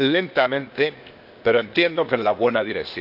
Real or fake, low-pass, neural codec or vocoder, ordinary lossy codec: fake; 5.4 kHz; codec, 16 kHz, 0.8 kbps, ZipCodec; none